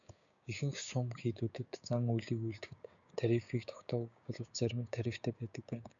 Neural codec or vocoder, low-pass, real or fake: codec, 16 kHz, 6 kbps, DAC; 7.2 kHz; fake